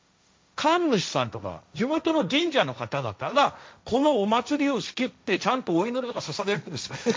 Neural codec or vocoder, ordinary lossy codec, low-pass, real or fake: codec, 16 kHz, 1.1 kbps, Voila-Tokenizer; none; none; fake